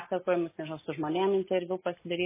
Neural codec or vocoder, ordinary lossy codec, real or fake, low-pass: none; MP3, 16 kbps; real; 3.6 kHz